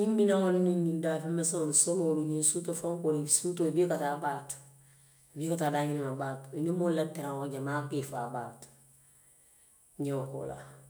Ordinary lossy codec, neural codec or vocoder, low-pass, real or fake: none; autoencoder, 48 kHz, 128 numbers a frame, DAC-VAE, trained on Japanese speech; none; fake